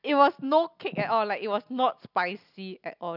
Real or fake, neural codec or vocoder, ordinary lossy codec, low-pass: real; none; none; 5.4 kHz